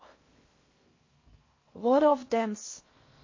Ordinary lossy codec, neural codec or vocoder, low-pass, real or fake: MP3, 32 kbps; codec, 16 kHz in and 24 kHz out, 0.6 kbps, FocalCodec, streaming, 4096 codes; 7.2 kHz; fake